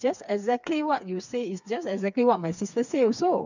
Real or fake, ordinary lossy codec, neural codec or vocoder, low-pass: fake; none; codec, 16 kHz, 4 kbps, FreqCodec, smaller model; 7.2 kHz